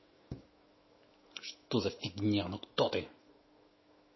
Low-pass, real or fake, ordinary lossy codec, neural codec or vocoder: 7.2 kHz; fake; MP3, 24 kbps; autoencoder, 48 kHz, 128 numbers a frame, DAC-VAE, trained on Japanese speech